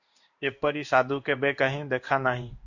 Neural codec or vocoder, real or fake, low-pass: codec, 16 kHz in and 24 kHz out, 1 kbps, XY-Tokenizer; fake; 7.2 kHz